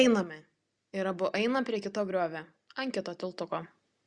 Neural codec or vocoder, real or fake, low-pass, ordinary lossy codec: none; real; 9.9 kHz; Opus, 64 kbps